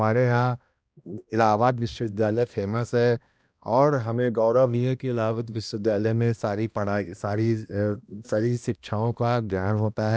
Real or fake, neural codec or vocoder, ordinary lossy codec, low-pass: fake; codec, 16 kHz, 1 kbps, X-Codec, HuBERT features, trained on balanced general audio; none; none